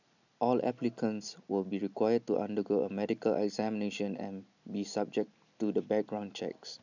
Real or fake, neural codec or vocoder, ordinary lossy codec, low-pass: real; none; none; 7.2 kHz